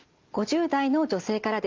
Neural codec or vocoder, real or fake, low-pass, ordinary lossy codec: none; real; 7.2 kHz; Opus, 32 kbps